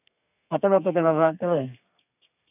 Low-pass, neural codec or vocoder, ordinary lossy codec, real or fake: 3.6 kHz; codec, 32 kHz, 1.9 kbps, SNAC; none; fake